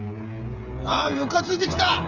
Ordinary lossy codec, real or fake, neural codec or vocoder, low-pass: none; fake; codec, 16 kHz, 8 kbps, FreqCodec, smaller model; 7.2 kHz